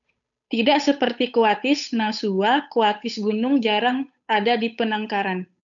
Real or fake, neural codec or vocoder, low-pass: fake; codec, 16 kHz, 8 kbps, FunCodec, trained on Chinese and English, 25 frames a second; 7.2 kHz